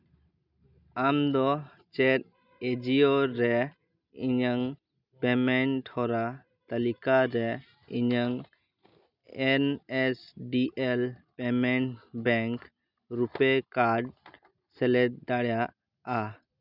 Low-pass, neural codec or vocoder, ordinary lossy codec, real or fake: 5.4 kHz; none; none; real